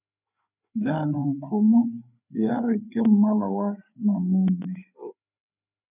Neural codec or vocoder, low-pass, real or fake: codec, 16 kHz, 4 kbps, FreqCodec, larger model; 3.6 kHz; fake